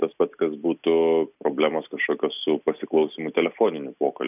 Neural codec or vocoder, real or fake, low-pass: none; real; 3.6 kHz